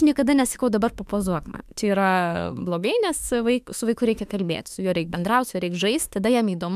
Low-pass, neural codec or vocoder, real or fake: 14.4 kHz; autoencoder, 48 kHz, 32 numbers a frame, DAC-VAE, trained on Japanese speech; fake